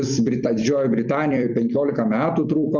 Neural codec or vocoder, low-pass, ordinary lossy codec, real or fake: vocoder, 44.1 kHz, 128 mel bands every 256 samples, BigVGAN v2; 7.2 kHz; Opus, 64 kbps; fake